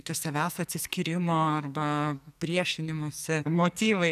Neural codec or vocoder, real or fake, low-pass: codec, 44.1 kHz, 2.6 kbps, SNAC; fake; 14.4 kHz